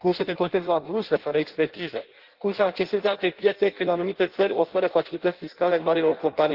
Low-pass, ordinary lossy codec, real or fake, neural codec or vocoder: 5.4 kHz; Opus, 16 kbps; fake; codec, 16 kHz in and 24 kHz out, 0.6 kbps, FireRedTTS-2 codec